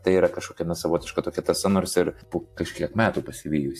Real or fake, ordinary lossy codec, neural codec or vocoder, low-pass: fake; AAC, 64 kbps; vocoder, 44.1 kHz, 128 mel bands every 512 samples, BigVGAN v2; 14.4 kHz